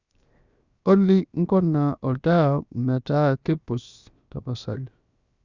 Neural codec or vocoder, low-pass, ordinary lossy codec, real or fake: codec, 16 kHz, 0.7 kbps, FocalCodec; 7.2 kHz; Opus, 64 kbps; fake